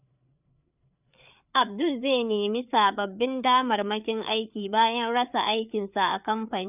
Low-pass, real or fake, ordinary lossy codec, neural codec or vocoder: 3.6 kHz; fake; none; codec, 16 kHz, 4 kbps, FreqCodec, larger model